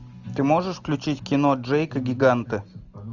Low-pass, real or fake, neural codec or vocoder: 7.2 kHz; real; none